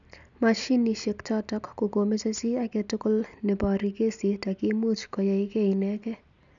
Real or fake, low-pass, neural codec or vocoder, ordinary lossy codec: real; 7.2 kHz; none; none